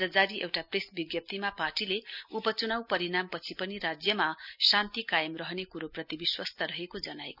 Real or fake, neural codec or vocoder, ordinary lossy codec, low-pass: real; none; none; 5.4 kHz